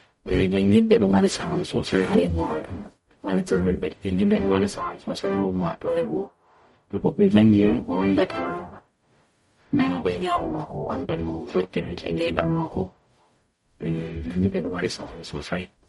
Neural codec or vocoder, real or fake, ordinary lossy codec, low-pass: codec, 44.1 kHz, 0.9 kbps, DAC; fake; MP3, 48 kbps; 19.8 kHz